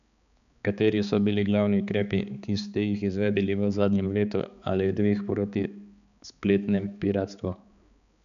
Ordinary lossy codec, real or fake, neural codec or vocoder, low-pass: none; fake; codec, 16 kHz, 4 kbps, X-Codec, HuBERT features, trained on balanced general audio; 7.2 kHz